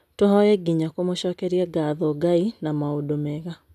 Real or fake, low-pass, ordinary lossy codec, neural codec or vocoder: real; 14.4 kHz; none; none